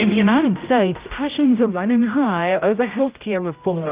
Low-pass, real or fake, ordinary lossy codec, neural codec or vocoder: 3.6 kHz; fake; Opus, 24 kbps; codec, 16 kHz, 0.5 kbps, X-Codec, HuBERT features, trained on general audio